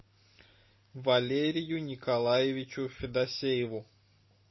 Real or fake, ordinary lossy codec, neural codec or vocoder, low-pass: real; MP3, 24 kbps; none; 7.2 kHz